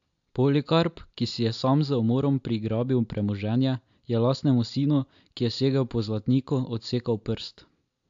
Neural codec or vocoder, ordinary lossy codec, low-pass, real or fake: none; AAC, 64 kbps; 7.2 kHz; real